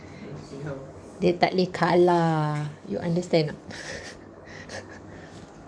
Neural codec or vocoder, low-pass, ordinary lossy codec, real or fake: codec, 44.1 kHz, 7.8 kbps, DAC; 9.9 kHz; none; fake